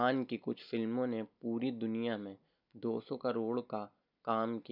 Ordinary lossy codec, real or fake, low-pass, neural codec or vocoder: none; real; 5.4 kHz; none